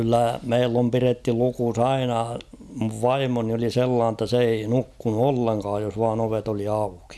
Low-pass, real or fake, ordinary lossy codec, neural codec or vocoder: none; real; none; none